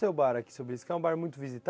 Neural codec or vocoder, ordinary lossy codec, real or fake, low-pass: none; none; real; none